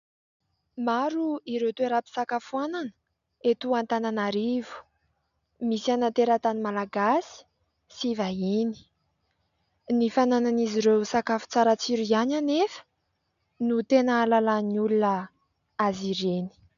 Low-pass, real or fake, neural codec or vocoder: 7.2 kHz; real; none